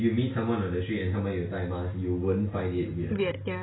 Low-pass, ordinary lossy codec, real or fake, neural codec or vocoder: 7.2 kHz; AAC, 16 kbps; real; none